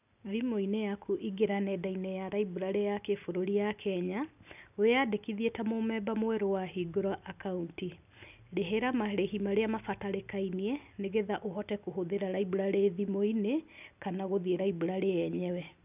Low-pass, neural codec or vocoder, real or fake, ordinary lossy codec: 3.6 kHz; none; real; none